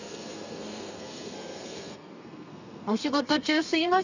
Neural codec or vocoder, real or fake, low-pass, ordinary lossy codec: codec, 32 kHz, 1.9 kbps, SNAC; fake; 7.2 kHz; none